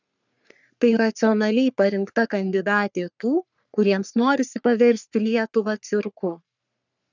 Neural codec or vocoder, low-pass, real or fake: codec, 44.1 kHz, 3.4 kbps, Pupu-Codec; 7.2 kHz; fake